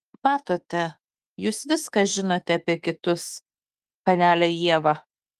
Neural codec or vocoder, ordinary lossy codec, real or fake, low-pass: autoencoder, 48 kHz, 32 numbers a frame, DAC-VAE, trained on Japanese speech; Opus, 24 kbps; fake; 14.4 kHz